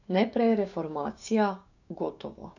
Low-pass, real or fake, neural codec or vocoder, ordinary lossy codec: 7.2 kHz; fake; codec, 16 kHz, 6 kbps, DAC; none